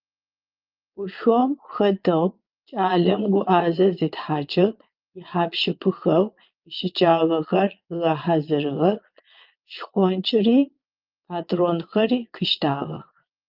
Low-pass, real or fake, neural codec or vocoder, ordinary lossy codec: 5.4 kHz; fake; vocoder, 22.05 kHz, 80 mel bands, Vocos; Opus, 24 kbps